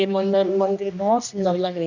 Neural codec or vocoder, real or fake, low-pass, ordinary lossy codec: codec, 16 kHz, 1 kbps, X-Codec, HuBERT features, trained on general audio; fake; 7.2 kHz; none